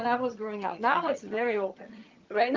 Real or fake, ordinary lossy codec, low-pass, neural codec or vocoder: fake; Opus, 24 kbps; 7.2 kHz; vocoder, 22.05 kHz, 80 mel bands, HiFi-GAN